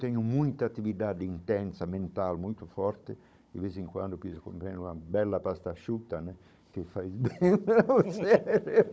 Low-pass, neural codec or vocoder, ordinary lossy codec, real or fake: none; codec, 16 kHz, 16 kbps, FunCodec, trained on Chinese and English, 50 frames a second; none; fake